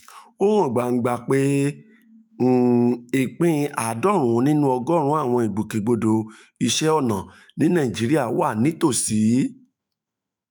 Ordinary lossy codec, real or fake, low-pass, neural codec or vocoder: none; fake; none; autoencoder, 48 kHz, 128 numbers a frame, DAC-VAE, trained on Japanese speech